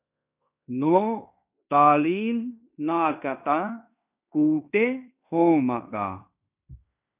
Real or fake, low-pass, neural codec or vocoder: fake; 3.6 kHz; codec, 16 kHz in and 24 kHz out, 0.9 kbps, LongCat-Audio-Codec, fine tuned four codebook decoder